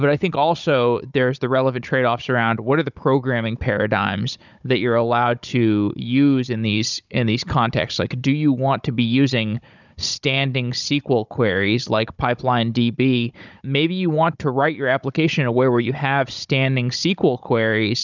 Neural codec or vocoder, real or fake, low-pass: codec, 16 kHz, 16 kbps, FunCodec, trained on Chinese and English, 50 frames a second; fake; 7.2 kHz